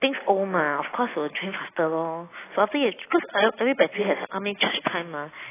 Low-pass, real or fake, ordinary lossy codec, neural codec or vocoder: 3.6 kHz; real; AAC, 16 kbps; none